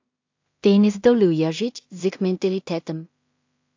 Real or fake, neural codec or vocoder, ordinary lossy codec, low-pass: fake; codec, 16 kHz in and 24 kHz out, 0.4 kbps, LongCat-Audio-Codec, two codebook decoder; AAC, 48 kbps; 7.2 kHz